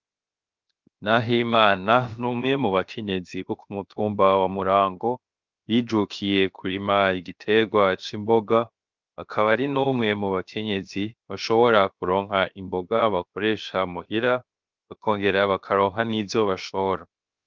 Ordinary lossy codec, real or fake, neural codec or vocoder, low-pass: Opus, 24 kbps; fake; codec, 16 kHz, 0.7 kbps, FocalCodec; 7.2 kHz